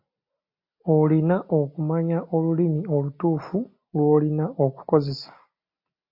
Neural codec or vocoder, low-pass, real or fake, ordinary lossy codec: none; 5.4 kHz; real; MP3, 32 kbps